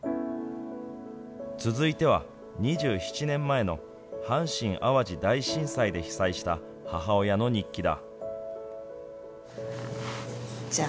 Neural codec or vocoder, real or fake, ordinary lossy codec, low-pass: none; real; none; none